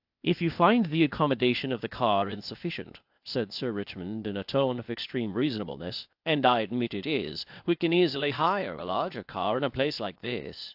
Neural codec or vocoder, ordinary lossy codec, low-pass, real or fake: codec, 16 kHz, 0.8 kbps, ZipCodec; AAC, 48 kbps; 5.4 kHz; fake